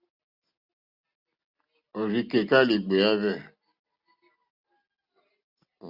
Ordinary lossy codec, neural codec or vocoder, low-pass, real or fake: Opus, 64 kbps; none; 5.4 kHz; real